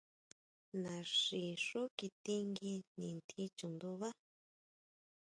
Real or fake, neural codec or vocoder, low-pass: real; none; 9.9 kHz